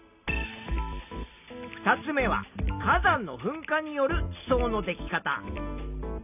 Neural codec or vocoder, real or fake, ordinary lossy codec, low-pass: none; real; none; 3.6 kHz